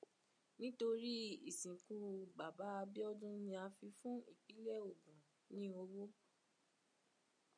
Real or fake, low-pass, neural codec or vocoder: real; 9.9 kHz; none